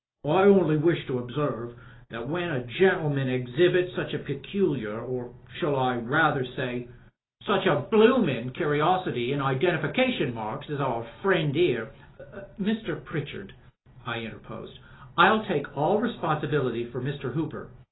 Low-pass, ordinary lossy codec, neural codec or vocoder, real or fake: 7.2 kHz; AAC, 16 kbps; none; real